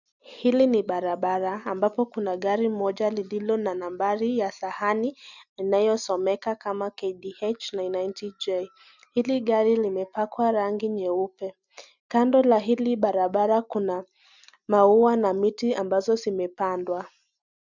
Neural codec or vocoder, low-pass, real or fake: none; 7.2 kHz; real